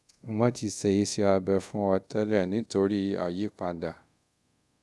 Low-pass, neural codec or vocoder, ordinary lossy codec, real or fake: none; codec, 24 kHz, 0.5 kbps, DualCodec; none; fake